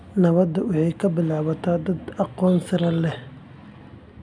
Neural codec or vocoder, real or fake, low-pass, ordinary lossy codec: none; real; 9.9 kHz; none